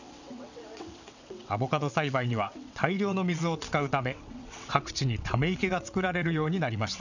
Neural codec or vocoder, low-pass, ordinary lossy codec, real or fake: vocoder, 22.05 kHz, 80 mel bands, WaveNeXt; 7.2 kHz; none; fake